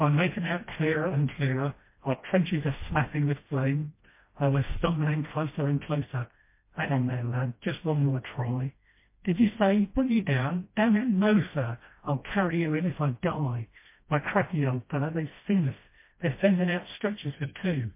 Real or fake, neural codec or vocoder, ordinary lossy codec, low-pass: fake; codec, 16 kHz, 1 kbps, FreqCodec, smaller model; MP3, 24 kbps; 3.6 kHz